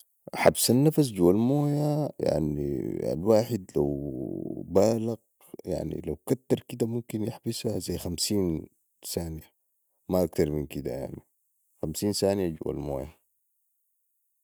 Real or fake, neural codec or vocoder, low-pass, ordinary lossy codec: real; none; none; none